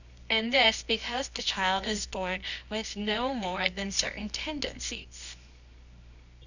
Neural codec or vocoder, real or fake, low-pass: codec, 24 kHz, 0.9 kbps, WavTokenizer, medium music audio release; fake; 7.2 kHz